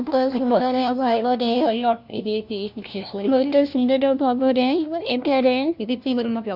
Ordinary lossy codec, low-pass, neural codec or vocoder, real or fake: none; 5.4 kHz; codec, 16 kHz, 1 kbps, FunCodec, trained on LibriTTS, 50 frames a second; fake